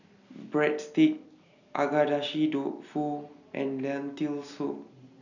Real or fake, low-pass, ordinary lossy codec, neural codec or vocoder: real; 7.2 kHz; none; none